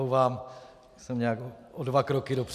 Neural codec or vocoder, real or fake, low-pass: none; real; 14.4 kHz